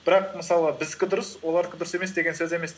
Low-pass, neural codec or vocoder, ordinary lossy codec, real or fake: none; none; none; real